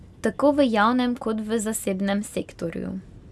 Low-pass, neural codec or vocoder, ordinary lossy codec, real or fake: none; none; none; real